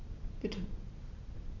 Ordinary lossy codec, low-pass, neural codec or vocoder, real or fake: none; 7.2 kHz; none; real